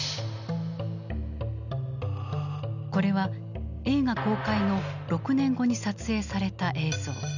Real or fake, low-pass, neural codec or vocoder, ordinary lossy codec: real; 7.2 kHz; none; none